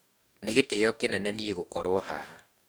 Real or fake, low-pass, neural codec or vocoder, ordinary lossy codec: fake; none; codec, 44.1 kHz, 2.6 kbps, DAC; none